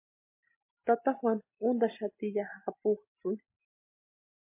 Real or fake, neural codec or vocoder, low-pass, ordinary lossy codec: real; none; 3.6 kHz; MP3, 24 kbps